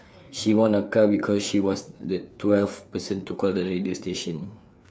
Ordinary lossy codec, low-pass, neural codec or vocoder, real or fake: none; none; codec, 16 kHz, 4 kbps, FreqCodec, larger model; fake